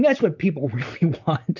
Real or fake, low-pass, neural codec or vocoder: fake; 7.2 kHz; vocoder, 44.1 kHz, 128 mel bands, Pupu-Vocoder